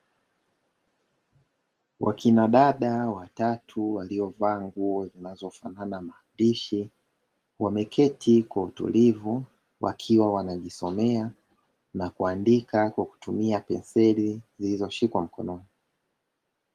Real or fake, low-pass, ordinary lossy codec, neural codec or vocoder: real; 14.4 kHz; Opus, 24 kbps; none